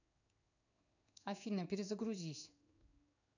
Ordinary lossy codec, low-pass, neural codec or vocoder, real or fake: none; 7.2 kHz; codec, 16 kHz in and 24 kHz out, 1 kbps, XY-Tokenizer; fake